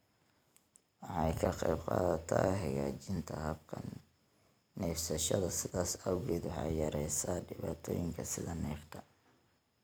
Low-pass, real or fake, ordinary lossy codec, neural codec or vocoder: none; fake; none; vocoder, 44.1 kHz, 128 mel bands every 256 samples, BigVGAN v2